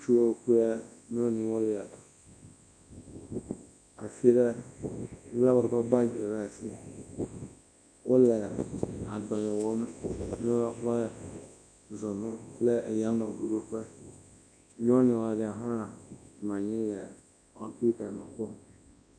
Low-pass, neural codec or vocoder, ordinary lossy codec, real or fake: 9.9 kHz; codec, 24 kHz, 0.9 kbps, WavTokenizer, large speech release; MP3, 64 kbps; fake